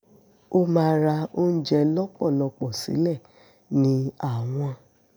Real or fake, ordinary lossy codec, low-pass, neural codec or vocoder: real; none; none; none